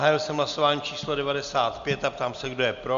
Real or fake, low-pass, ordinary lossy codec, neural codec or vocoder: real; 7.2 kHz; MP3, 64 kbps; none